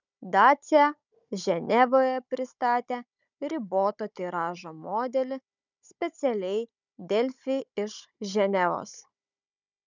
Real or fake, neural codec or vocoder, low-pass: fake; codec, 16 kHz, 16 kbps, FunCodec, trained on Chinese and English, 50 frames a second; 7.2 kHz